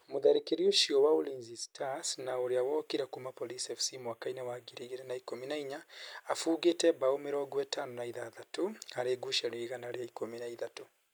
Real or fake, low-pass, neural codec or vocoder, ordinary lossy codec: real; none; none; none